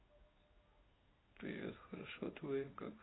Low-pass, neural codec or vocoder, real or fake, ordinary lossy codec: 7.2 kHz; codec, 16 kHz in and 24 kHz out, 1 kbps, XY-Tokenizer; fake; AAC, 16 kbps